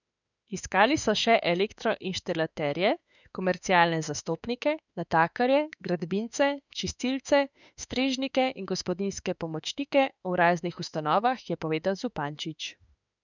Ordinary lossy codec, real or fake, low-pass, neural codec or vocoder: none; fake; 7.2 kHz; autoencoder, 48 kHz, 32 numbers a frame, DAC-VAE, trained on Japanese speech